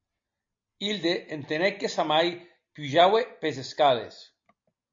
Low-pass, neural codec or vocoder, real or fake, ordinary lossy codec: 7.2 kHz; none; real; MP3, 48 kbps